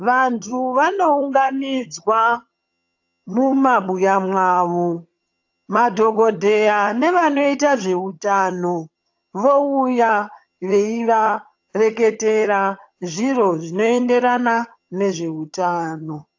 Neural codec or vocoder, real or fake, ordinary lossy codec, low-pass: vocoder, 22.05 kHz, 80 mel bands, HiFi-GAN; fake; AAC, 48 kbps; 7.2 kHz